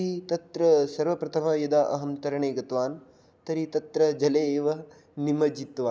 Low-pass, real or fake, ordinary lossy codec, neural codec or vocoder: none; real; none; none